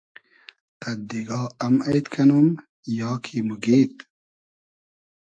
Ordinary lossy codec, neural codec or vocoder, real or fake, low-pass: AAC, 64 kbps; autoencoder, 48 kHz, 128 numbers a frame, DAC-VAE, trained on Japanese speech; fake; 9.9 kHz